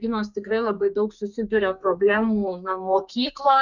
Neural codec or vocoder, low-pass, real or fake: codec, 32 kHz, 1.9 kbps, SNAC; 7.2 kHz; fake